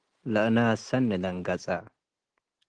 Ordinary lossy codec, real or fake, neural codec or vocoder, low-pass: Opus, 16 kbps; real; none; 9.9 kHz